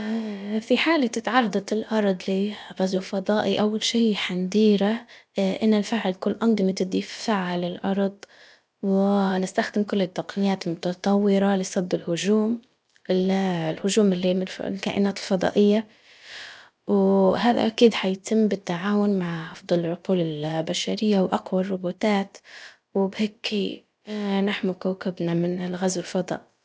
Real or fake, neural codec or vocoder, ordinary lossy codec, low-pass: fake; codec, 16 kHz, about 1 kbps, DyCAST, with the encoder's durations; none; none